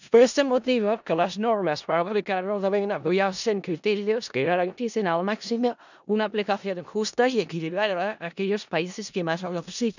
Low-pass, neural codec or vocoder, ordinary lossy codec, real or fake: 7.2 kHz; codec, 16 kHz in and 24 kHz out, 0.4 kbps, LongCat-Audio-Codec, four codebook decoder; none; fake